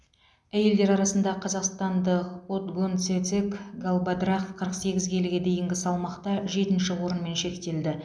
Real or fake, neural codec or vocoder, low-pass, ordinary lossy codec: real; none; none; none